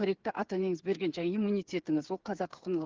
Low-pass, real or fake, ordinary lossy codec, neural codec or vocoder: 7.2 kHz; fake; Opus, 16 kbps; codec, 16 kHz, 8 kbps, FreqCodec, smaller model